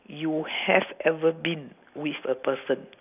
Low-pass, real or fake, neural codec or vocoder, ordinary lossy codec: 3.6 kHz; real; none; none